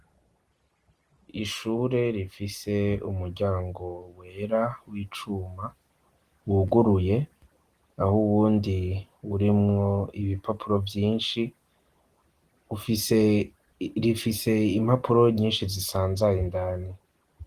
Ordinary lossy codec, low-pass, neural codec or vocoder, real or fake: Opus, 24 kbps; 14.4 kHz; none; real